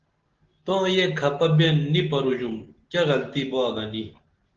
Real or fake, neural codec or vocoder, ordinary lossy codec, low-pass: real; none; Opus, 16 kbps; 7.2 kHz